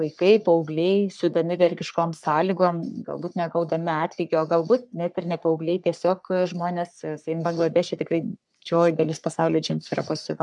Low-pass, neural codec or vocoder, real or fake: 10.8 kHz; codec, 44.1 kHz, 3.4 kbps, Pupu-Codec; fake